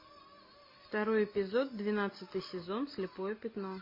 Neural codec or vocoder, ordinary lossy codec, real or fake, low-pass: none; MP3, 24 kbps; real; 5.4 kHz